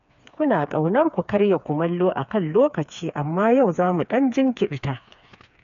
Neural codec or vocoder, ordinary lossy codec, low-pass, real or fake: codec, 16 kHz, 4 kbps, FreqCodec, smaller model; none; 7.2 kHz; fake